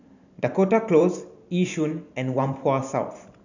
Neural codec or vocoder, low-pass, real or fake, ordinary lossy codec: vocoder, 44.1 kHz, 128 mel bands every 512 samples, BigVGAN v2; 7.2 kHz; fake; none